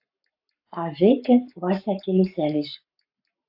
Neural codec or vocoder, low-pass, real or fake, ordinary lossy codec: codec, 44.1 kHz, 7.8 kbps, Pupu-Codec; 5.4 kHz; fake; AAC, 48 kbps